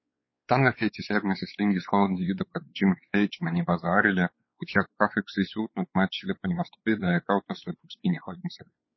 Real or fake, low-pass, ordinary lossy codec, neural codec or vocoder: fake; 7.2 kHz; MP3, 24 kbps; codec, 16 kHz, 4 kbps, X-Codec, WavLM features, trained on Multilingual LibriSpeech